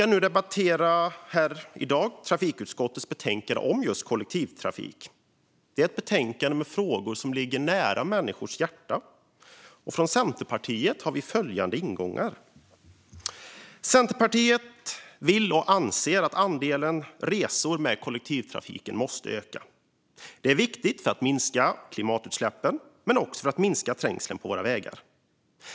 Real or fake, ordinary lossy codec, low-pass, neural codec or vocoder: real; none; none; none